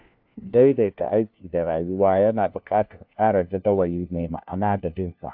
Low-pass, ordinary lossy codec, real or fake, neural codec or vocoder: 5.4 kHz; AAC, 48 kbps; fake; codec, 16 kHz, 1 kbps, FunCodec, trained on LibriTTS, 50 frames a second